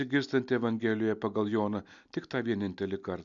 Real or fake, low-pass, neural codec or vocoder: real; 7.2 kHz; none